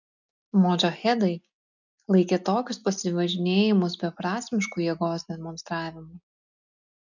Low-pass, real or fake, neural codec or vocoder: 7.2 kHz; real; none